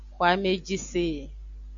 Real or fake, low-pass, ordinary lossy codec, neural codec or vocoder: real; 7.2 kHz; MP3, 64 kbps; none